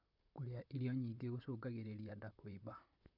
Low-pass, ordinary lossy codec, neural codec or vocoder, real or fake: 5.4 kHz; none; vocoder, 44.1 kHz, 128 mel bands, Pupu-Vocoder; fake